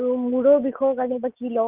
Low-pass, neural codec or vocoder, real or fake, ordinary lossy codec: 3.6 kHz; none; real; Opus, 32 kbps